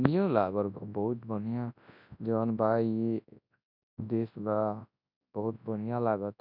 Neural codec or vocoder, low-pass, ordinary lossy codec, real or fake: codec, 24 kHz, 0.9 kbps, WavTokenizer, large speech release; 5.4 kHz; none; fake